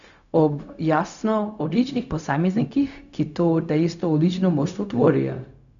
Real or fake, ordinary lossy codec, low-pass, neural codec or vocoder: fake; none; 7.2 kHz; codec, 16 kHz, 0.4 kbps, LongCat-Audio-Codec